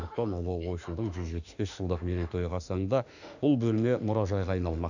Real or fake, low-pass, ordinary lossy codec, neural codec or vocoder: fake; 7.2 kHz; none; autoencoder, 48 kHz, 32 numbers a frame, DAC-VAE, trained on Japanese speech